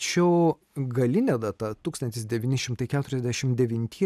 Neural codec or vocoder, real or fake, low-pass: none; real; 14.4 kHz